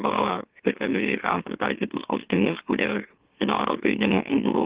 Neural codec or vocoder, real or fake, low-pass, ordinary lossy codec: autoencoder, 44.1 kHz, a latent of 192 numbers a frame, MeloTTS; fake; 3.6 kHz; Opus, 16 kbps